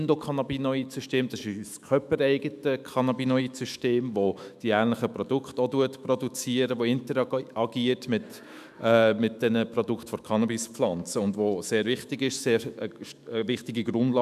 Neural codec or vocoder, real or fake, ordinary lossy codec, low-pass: autoencoder, 48 kHz, 128 numbers a frame, DAC-VAE, trained on Japanese speech; fake; none; 14.4 kHz